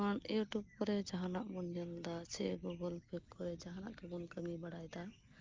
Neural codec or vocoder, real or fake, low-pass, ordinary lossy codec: none; real; 7.2 kHz; Opus, 24 kbps